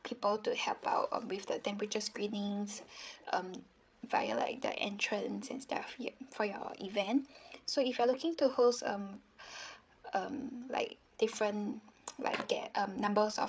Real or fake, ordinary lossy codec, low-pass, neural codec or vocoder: fake; none; none; codec, 16 kHz, 8 kbps, FreqCodec, larger model